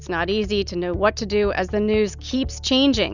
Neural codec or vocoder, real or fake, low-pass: none; real; 7.2 kHz